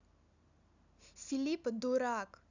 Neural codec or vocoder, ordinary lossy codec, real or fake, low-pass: none; none; real; 7.2 kHz